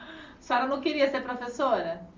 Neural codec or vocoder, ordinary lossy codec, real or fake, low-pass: none; Opus, 32 kbps; real; 7.2 kHz